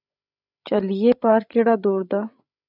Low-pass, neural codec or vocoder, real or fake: 5.4 kHz; codec, 16 kHz, 16 kbps, FreqCodec, larger model; fake